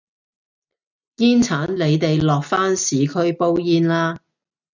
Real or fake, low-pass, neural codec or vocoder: real; 7.2 kHz; none